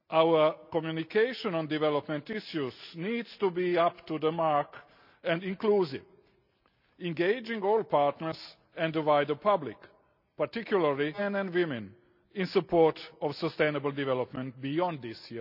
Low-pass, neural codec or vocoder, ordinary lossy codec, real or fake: 5.4 kHz; none; none; real